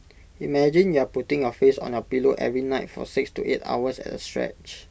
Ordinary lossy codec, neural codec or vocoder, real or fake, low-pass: none; none; real; none